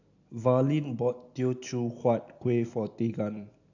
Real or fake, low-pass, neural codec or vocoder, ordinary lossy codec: real; 7.2 kHz; none; AAC, 48 kbps